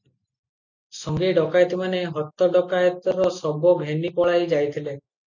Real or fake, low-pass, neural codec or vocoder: real; 7.2 kHz; none